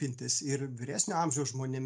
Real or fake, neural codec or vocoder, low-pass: real; none; 9.9 kHz